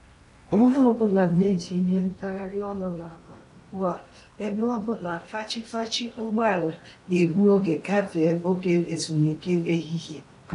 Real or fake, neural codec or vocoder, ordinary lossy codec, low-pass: fake; codec, 16 kHz in and 24 kHz out, 0.8 kbps, FocalCodec, streaming, 65536 codes; AAC, 48 kbps; 10.8 kHz